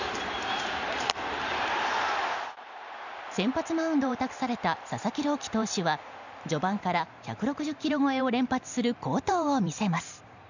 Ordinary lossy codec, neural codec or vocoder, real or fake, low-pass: none; vocoder, 44.1 kHz, 128 mel bands every 512 samples, BigVGAN v2; fake; 7.2 kHz